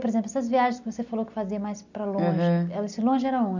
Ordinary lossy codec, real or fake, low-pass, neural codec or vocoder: none; real; 7.2 kHz; none